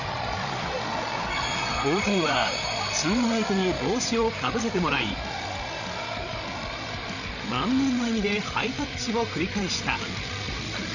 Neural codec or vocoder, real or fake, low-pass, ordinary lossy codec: codec, 16 kHz, 16 kbps, FreqCodec, larger model; fake; 7.2 kHz; none